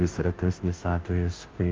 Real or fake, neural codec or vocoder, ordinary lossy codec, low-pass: fake; codec, 16 kHz, 0.5 kbps, FunCodec, trained on Chinese and English, 25 frames a second; Opus, 24 kbps; 7.2 kHz